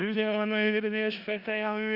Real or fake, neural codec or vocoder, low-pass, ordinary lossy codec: fake; codec, 16 kHz in and 24 kHz out, 0.4 kbps, LongCat-Audio-Codec, four codebook decoder; 5.4 kHz; Opus, 64 kbps